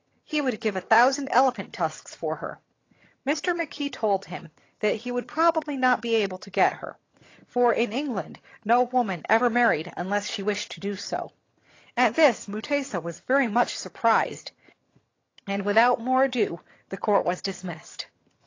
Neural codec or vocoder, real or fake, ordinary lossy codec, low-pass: vocoder, 22.05 kHz, 80 mel bands, HiFi-GAN; fake; AAC, 32 kbps; 7.2 kHz